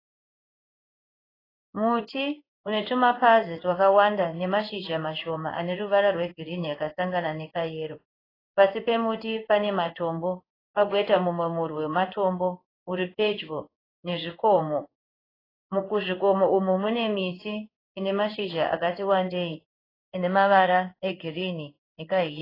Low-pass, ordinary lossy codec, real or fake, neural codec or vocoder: 5.4 kHz; AAC, 24 kbps; fake; codec, 16 kHz in and 24 kHz out, 1 kbps, XY-Tokenizer